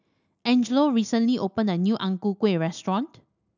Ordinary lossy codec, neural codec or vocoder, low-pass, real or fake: none; none; 7.2 kHz; real